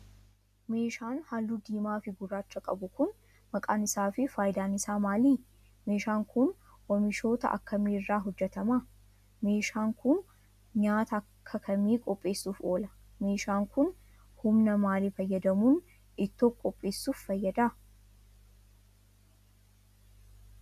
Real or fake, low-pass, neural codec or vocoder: real; 14.4 kHz; none